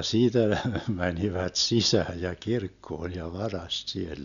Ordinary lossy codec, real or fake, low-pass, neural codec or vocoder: none; real; 7.2 kHz; none